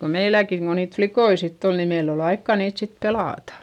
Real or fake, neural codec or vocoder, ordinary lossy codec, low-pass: real; none; none; 19.8 kHz